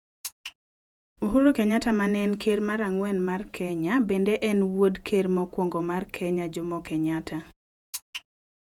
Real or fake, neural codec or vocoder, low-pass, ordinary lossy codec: fake; vocoder, 48 kHz, 128 mel bands, Vocos; 19.8 kHz; none